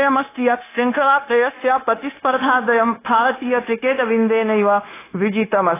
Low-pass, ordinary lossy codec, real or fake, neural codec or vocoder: 3.6 kHz; AAC, 16 kbps; fake; codec, 16 kHz, 0.9 kbps, LongCat-Audio-Codec